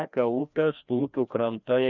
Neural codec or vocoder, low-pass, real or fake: codec, 16 kHz, 1 kbps, FreqCodec, larger model; 7.2 kHz; fake